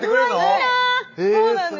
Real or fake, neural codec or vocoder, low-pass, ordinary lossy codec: real; none; 7.2 kHz; none